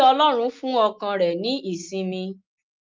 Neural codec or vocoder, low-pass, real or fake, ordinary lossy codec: none; 7.2 kHz; real; Opus, 32 kbps